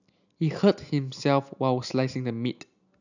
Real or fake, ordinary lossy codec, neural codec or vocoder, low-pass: real; none; none; 7.2 kHz